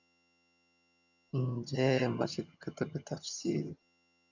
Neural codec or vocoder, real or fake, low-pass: vocoder, 22.05 kHz, 80 mel bands, HiFi-GAN; fake; 7.2 kHz